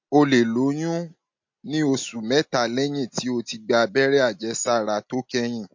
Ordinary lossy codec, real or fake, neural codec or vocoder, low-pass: MP3, 48 kbps; real; none; 7.2 kHz